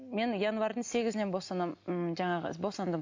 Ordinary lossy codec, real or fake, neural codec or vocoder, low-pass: MP3, 48 kbps; real; none; 7.2 kHz